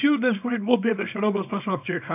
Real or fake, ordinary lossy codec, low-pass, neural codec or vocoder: fake; none; 3.6 kHz; codec, 24 kHz, 0.9 kbps, WavTokenizer, small release